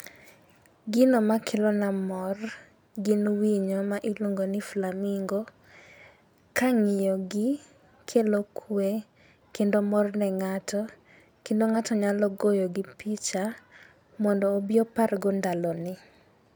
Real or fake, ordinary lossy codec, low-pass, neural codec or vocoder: real; none; none; none